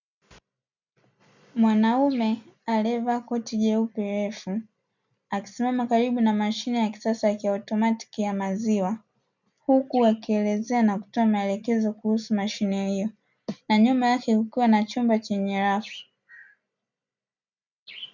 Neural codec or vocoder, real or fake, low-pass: none; real; 7.2 kHz